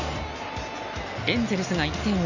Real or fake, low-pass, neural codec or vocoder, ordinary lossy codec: real; 7.2 kHz; none; none